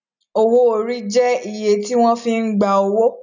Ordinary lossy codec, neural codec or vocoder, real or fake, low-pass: none; none; real; 7.2 kHz